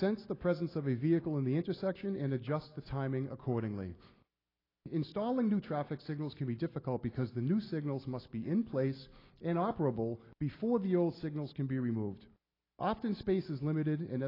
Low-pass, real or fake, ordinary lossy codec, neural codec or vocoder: 5.4 kHz; real; AAC, 24 kbps; none